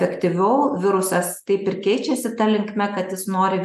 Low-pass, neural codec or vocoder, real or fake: 14.4 kHz; none; real